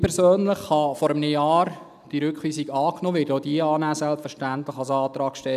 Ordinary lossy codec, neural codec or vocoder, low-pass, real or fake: none; none; 14.4 kHz; real